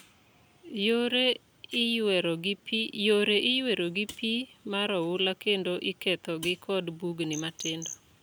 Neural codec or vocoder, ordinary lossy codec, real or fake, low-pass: none; none; real; none